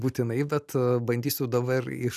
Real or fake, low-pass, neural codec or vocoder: real; 14.4 kHz; none